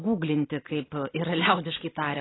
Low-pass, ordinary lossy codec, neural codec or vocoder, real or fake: 7.2 kHz; AAC, 16 kbps; none; real